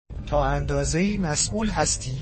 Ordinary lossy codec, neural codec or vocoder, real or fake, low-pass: MP3, 32 kbps; codec, 32 kHz, 1.9 kbps, SNAC; fake; 10.8 kHz